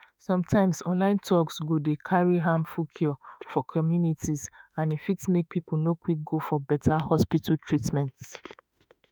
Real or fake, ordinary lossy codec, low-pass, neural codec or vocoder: fake; none; none; autoencoder, 48 kHz, 32 numbers a frame, DAC-VAE, trained on Japanese speech